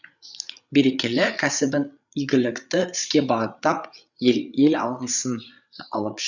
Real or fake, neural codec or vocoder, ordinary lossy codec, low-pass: fake; codec, 16 kHz, 8 kbps, FreqCodec, larger model; none; 7.2 kHz